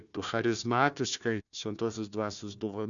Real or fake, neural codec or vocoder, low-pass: fake; codec, 16 kHz, 1 kbps, FunCodec, trained on Chinese and English, 50 frames a second; 7.2 kHz